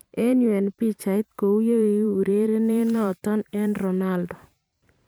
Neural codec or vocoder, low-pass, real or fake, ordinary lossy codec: vocoder, 44.1 kHz, 128 mel bands every 512 samples, BigVGAN v2; none; fake; none